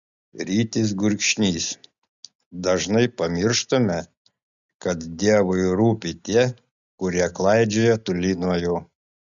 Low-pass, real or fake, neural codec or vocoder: 7.2 kHz; real; none